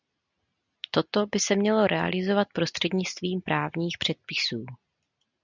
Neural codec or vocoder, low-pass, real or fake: none; 7.2 kHz; real